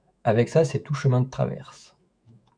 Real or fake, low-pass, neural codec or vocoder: fake; 9.9 kHz; autoencoder, 48 kHz, 128 numbers a frame, DAC-VAE, trained on Japanese speech